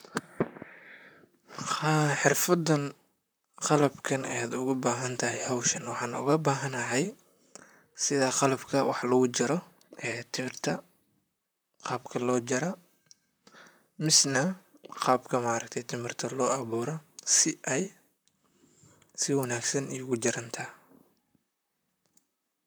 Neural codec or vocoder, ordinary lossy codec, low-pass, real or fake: vocoder, 44.1 kHz, 128 mel bands, Pupu-Vocoder; none; none; fake